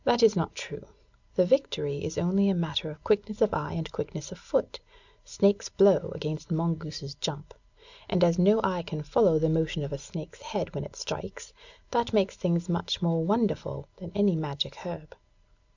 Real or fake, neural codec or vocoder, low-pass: real; none; 7.2 kHz